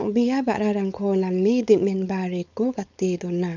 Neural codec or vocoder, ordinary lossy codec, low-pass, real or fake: codec, 16 kHz, 4.8 kbps, FACodec; none; 7.2 kHz; fake